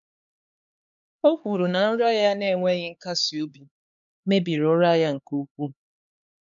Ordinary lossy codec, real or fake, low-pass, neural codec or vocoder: none; fake; 7.2 kHz; codec, 16 kHz, 4 kbps, X-Codec, HuBERT features, trained on LibriSpeech